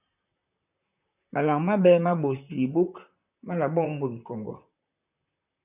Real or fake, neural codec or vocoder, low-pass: fake; vocoder, 44.1 kHz, 128 mel bands, Pupu-Vocoder; 3.6 kHz